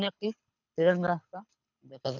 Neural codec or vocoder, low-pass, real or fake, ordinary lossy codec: codec, 24 kHz, 6 kbps, HILCodec; 7.2 kHz; fake; none